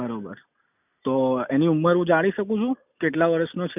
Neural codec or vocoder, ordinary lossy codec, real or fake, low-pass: none; none; real; 3.6 kHz